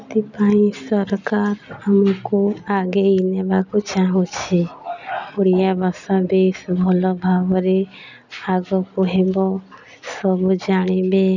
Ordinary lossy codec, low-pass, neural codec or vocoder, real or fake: none; 7.2 kHz; none; real